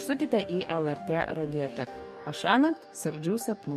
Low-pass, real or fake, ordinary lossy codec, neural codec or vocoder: 14.4 kHz; fake; MP3, 64 kbps; codec, 44.1 kHz, 2.6 kbps, DAC